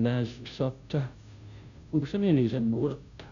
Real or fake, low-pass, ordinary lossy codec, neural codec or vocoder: fake; 7.2 kHz; none; codec, 16 kHz, 0.5 kbps, FunCodec, trained on Chinese and English, 25 frames a second